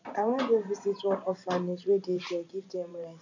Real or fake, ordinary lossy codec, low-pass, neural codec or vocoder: fake; none; 7.2 kHz; vocoder, 44.1 kHz, 128 mel bands every 512 samples, BigVGAN v2